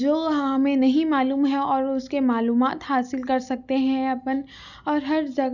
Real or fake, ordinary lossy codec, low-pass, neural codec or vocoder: real; none; 7.2 kHz; none